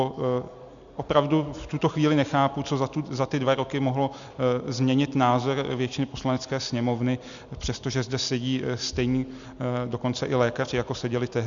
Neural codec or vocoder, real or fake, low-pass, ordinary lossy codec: none; real; 7.2 kHz; Opus, 64 kbps